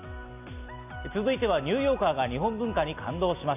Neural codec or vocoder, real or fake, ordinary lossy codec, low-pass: none; real; none; 3.6 kHz